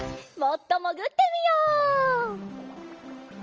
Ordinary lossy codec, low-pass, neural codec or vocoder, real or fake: Opus, 24 kbps; 7.2 kHz; none; real